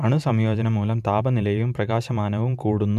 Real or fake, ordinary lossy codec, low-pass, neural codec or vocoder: fake; MP3, 96 kbps; 14.4 kHz; vocoder, 44.1 kHz, 128 mel bands every 512 samples, BigVGAN v2